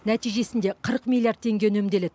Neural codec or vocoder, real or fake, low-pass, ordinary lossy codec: none; real; none; none